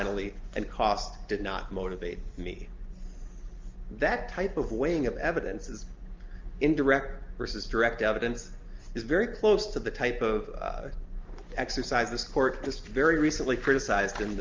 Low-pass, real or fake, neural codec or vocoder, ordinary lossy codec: 7.2 kHz; real; none; Opus, 32 kbps